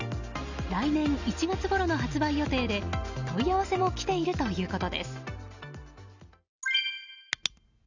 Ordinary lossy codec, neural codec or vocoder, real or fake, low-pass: Opus, 64 kbps; none; real; 7.2 kHz